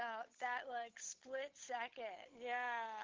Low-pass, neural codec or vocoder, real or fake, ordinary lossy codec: 7.2 kHz; codec, 16 kHz, 8 kbps, FunCodec, trained on Chinese and English, 25 frames a second; fake; Opus, 16 kbps